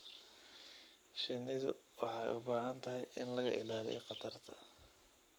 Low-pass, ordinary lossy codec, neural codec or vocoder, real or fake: none; none; codec, 44.1 kHz, 7.8 kbps, Pupu-Codec; fake